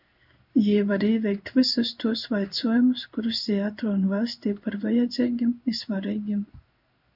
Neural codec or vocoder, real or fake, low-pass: codec, 16 kHz in and 24 kHz out, 1 kbps, XY-Tokenizer; fake; 5.4 kHz